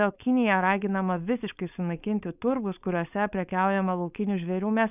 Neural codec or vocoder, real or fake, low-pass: codec, 16 kHz, 4.8 kbps, FACodec; fake; 3.6 kHz